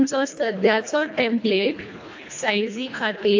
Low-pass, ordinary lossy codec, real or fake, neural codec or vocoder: 7.2 kHz; AAC, 48 kbps; fake; codec, 24 kHz, 1.5 kbps, HILCodec